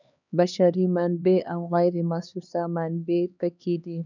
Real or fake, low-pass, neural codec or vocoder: fake; 7.2 kHz; codec, 16 kHz, 2 kbps, X-Codec, HuBERT features, trained on LibriSpeech